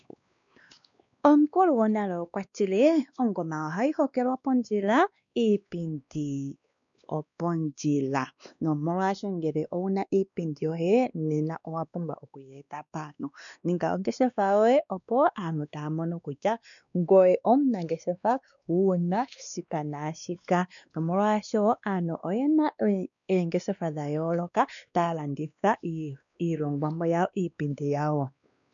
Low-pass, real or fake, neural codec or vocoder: 7.2 kHz; fake; codec, 16 kHz, 2 kbps, X-Codec, WavLM features, trained on Multilingual LibriSpeech